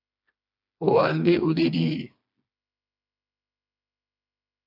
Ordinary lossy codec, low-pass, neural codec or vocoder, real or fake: AAC, 32 kbps; 5.4 kHz; codec, 16 kHz, 2 kbps, FreqCodec, smaller model; fake